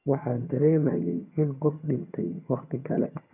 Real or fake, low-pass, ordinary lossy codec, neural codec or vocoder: fake; 3.6 kHz; none; vocoder, 22.05 kHz, 80 mel bands, HiFi-GAN